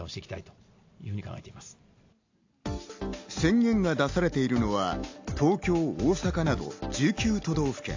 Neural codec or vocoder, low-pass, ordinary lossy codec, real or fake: none; 7.2 kHz; AAC, 48 kbps; real